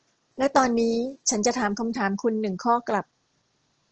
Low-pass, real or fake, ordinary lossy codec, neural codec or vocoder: 7.2 kHz; real; Opus, 16 kbps; none